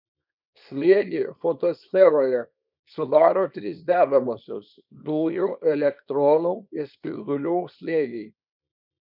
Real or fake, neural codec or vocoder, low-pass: fake; codec, 24 kHz, 0.9 kbps, WavTokenizer, small release; 5.4 kHz